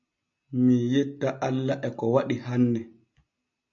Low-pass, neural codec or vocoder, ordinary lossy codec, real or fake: 7.2 kHz; none; AAC, 64 kbps; real